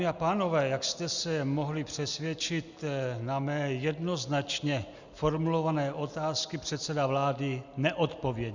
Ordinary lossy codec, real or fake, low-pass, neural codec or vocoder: Opus, 64 kbps; real; 7.2 kHz; none